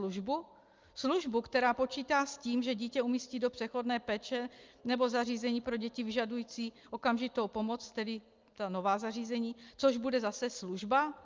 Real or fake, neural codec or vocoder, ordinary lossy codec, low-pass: real; none; Opus, 32 kbps; 7.2 kHz